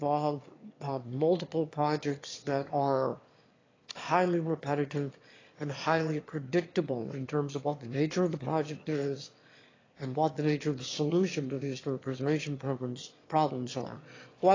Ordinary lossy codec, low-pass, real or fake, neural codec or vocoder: AAC, 32 kbps; 7.2 kHz; fake; autoencoder, 22.05 kHz, a latent of 192 numbers a frame, VITS, trained on one speaker